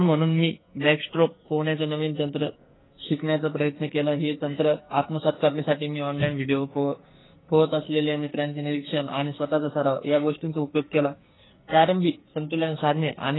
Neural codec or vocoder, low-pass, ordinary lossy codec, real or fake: codec, 44.1 kHz, 2.6 kbps, SNAC; 7.2 kHz; AAC, 16 kbps; fake